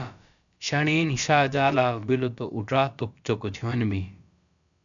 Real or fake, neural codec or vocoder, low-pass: fake; codec, 16 kHz, about 1 kbps, DyCAST, with the encoder's durations; 7.2 kHz